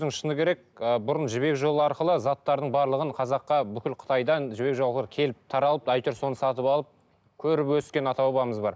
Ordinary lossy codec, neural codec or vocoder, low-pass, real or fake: none; none; none; real